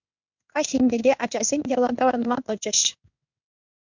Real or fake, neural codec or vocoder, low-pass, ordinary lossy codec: fake; codec, 16 kHz in and 24 kHz out, 1 kbps, XY-Tokenizer; 7.2 kHz; MP3, 64 kbps